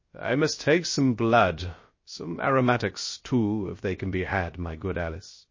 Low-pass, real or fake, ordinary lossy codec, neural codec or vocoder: 7.2 kHz; fake; MP3, 32 kbps; codec, 16 kHz, 0.3 kbps, FocalCodec